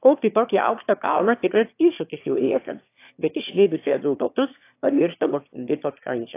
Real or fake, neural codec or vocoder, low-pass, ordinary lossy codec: fake; autoencoder, 22.05 kHz, a latent of 192 numbers a frame, VITS, trained on one speaker; 3.6 kHz; AAC, 24 kbps